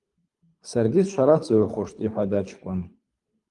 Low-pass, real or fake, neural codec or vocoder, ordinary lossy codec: 10.8 kHz; fake; codec, 24 kHz, 3 kbps, HILCodec; Opus, 32 kbps